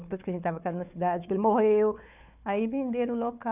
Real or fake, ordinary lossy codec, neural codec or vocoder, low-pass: fake; none; codec, 16 kHz, 4 kbps, FunCodec, trained on Chinese and English, 50 frames a second; 3.6 kHz